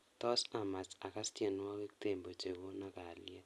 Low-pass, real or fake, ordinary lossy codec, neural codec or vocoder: none; real; none; none